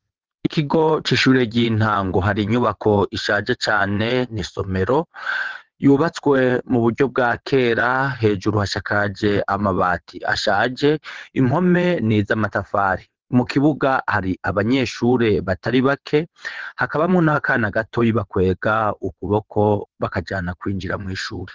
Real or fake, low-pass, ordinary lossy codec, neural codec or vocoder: fake; 7.2 kHz; Opus, 16 kbps; vocoder, 22.05 kHz, 80 mel bands, WaveNeXt